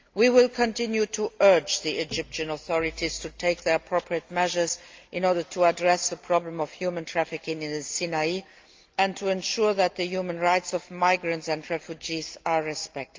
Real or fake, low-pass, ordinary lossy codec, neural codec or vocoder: real; 7.2 kHz; Opus, 32 kbps; none